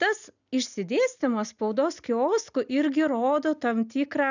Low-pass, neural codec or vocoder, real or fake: 7.2 kHz; none; real